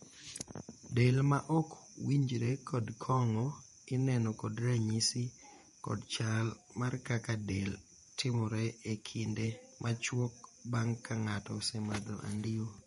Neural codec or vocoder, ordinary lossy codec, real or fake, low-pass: vocoder, 48 kHz, 128 mel bands, Vocos; MP3, 48 kbps; fake; 19.8 kHz